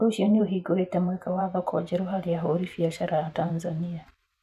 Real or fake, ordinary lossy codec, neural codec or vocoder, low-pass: fake; none; vocoder, 44.1 kHz, 128 mel bands every 512 samples, BigVGAN v2; 14.4 kHz